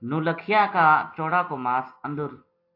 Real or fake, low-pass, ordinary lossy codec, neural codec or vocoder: real; 5.4 kHz; AAC, 32 kbps; none